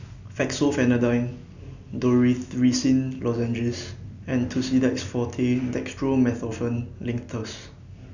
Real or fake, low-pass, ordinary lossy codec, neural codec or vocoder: real; 7.2 kHz; none; none